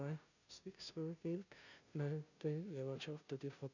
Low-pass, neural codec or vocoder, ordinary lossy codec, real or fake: 7.2 kHz; codec, 16 kHz, 0.5 kbps, FunCodec, trained on Chinese and English, 25 frames a second; none; fake